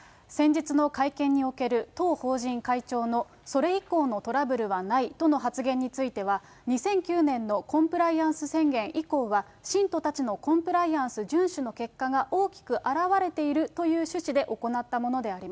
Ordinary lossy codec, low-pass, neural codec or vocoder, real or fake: none; none; none; real